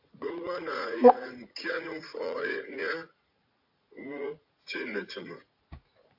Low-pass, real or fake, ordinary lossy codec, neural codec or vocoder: 5.4 kHz; fake; AAC, 48 kbps; vocoder, 44.1 kHz, 80 mel bands, Vocos